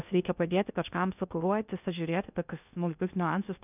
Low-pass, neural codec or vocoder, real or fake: 3.6 kHz; codec, 16 kHz in and 24 kHz out, 0.6 kbps, FocalCodec, streaming, 2048 codes; fake